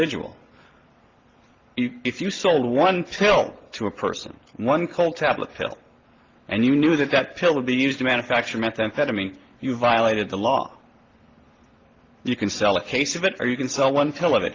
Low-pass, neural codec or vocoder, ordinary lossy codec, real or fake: 7.2 kHz; none; Opus, 16 kbps; real